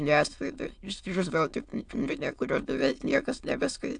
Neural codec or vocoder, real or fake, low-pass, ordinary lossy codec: autoencoder, 22.05 kHz, a latent of 192 numbers a frame, VITS, trained on many speakers; fake; 9.9 kHz; AAC, 64 kbps